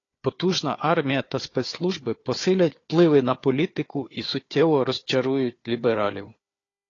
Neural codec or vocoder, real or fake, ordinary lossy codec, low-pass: codec, 16 kHz, 4 kbps, FunCodec, trained on Chinese and English, 50 frames a second; fake; AAC, 32 kbps; 7.2 kHz